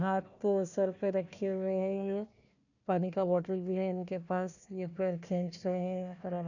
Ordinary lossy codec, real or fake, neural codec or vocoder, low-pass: none; fake; codec, 16 kHz, 1 kbps, FunCodec, trained on Chinese and English, 50 frames a second; 7.2 kHz